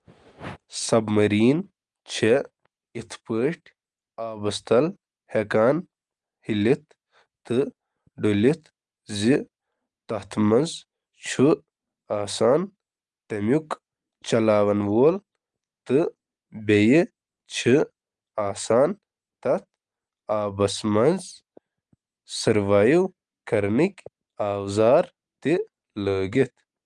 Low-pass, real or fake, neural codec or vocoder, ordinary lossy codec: 10.8 kHz; real; none; Opus, 32 kbps